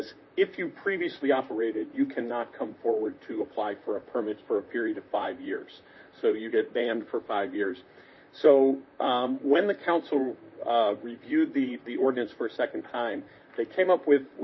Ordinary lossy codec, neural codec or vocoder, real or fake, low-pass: MP3, 24 kbps; vocoder, 44.1 kHz, 128 mel bands, Pupu-Vocoder; fake; 7.2 kHz